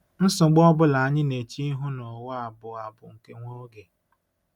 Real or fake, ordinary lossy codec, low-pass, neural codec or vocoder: real; none; 19.8 kHz; none